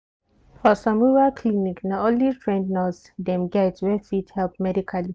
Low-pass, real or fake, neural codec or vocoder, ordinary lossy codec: none; real; none; none